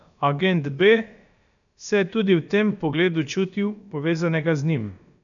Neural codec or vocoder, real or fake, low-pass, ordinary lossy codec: codec, 16 kHz, about 1 kbps, DyCAST, with the encoder's durations; fake; 7.2 kHz; none